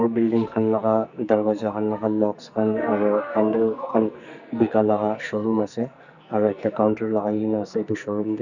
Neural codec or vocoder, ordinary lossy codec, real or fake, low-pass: codec, 44.1 kHz, 2.6 kbps, SNAC; none; fake; 7.2 kHz